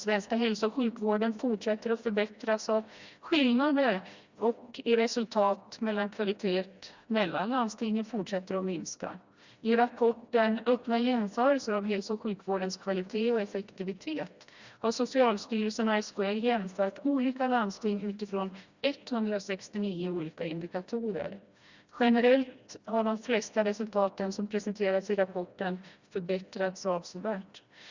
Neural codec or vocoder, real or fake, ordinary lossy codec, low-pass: codec, 16 kHz, 1 kbps, FreqCodec, smaller model; fake; Opus, 64 kbps; 7.2 kHz